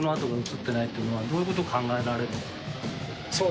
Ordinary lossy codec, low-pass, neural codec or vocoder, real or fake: none; none; none; real